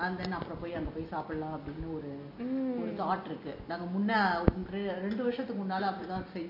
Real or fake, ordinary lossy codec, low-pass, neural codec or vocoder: real; MP3, 32 kbps; 5.4 kHz; none